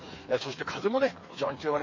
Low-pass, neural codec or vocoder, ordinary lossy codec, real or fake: 7.2 kHz; codec, 16 kHz, 4 kbps, FreqCodec, smaller model; MP3, 32 kbps; fake